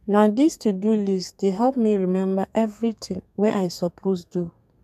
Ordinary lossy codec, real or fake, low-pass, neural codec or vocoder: none; fake; 14.4 kHz; codec, 32 kHz, 1.9 kbps, SNAC